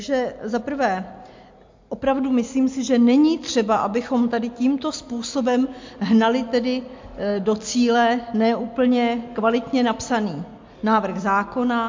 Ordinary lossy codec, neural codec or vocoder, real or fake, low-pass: MP3, 48 kbps; none; real; 7.2 kHz